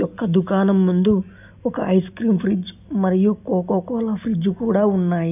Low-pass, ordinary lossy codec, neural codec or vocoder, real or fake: 3.6 kHz; none; none; real